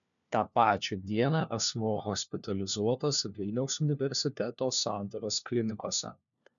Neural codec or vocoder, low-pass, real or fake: codec, 16 kHz, 1 kbps, FunCodec, trained on LibriTTS, 50 frames a second; 7.2 kHz; fake